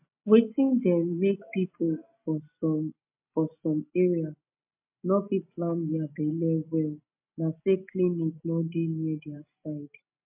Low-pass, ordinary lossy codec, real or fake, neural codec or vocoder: 3.6 kHz; MP3, 32 kbps; real; none